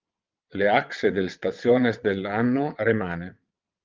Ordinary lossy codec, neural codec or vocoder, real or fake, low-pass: Opus, 32 kbps; vocoder, 22.05 kHz, 80 mel bands, WaveNeXt; fake; 7.2 kHz